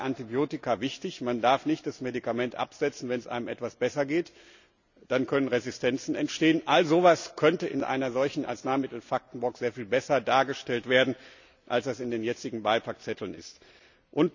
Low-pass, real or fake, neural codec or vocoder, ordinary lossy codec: 7.2 kHz; real; none; none